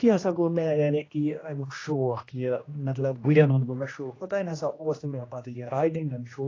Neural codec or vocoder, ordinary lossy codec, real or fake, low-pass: codec, 16 kHz, 1 kbps, X-Codec, HuBERT features, trained on general audio; AAC, 32 kbps; fake; 7.2 kHz